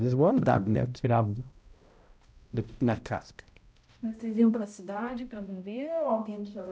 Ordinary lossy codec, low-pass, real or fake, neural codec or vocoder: none; none; fake; codec, 16 kHz, 0.5 kbps, X-Codec, HuBERT features, trained on balanced general audio